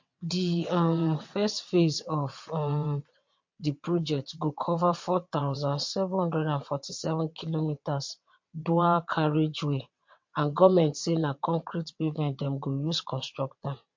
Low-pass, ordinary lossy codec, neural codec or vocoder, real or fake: 7.2 kHz; MP3, 48 kbps; vocoder, 22.05 kHz, 80 mel bands, WaveNeXt; fake